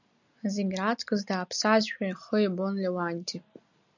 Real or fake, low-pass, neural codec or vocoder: real; 7.2 kHz; none